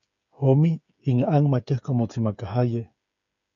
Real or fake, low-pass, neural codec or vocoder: fake; 7.2 kHz; codec, 16 kHz, 8 kbps, FreqCodec, smaller model